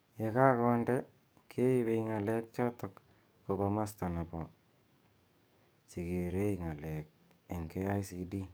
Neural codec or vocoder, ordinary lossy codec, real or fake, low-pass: codec, 44.1 kHz, 7.8 kbps, DAC; none; fake; none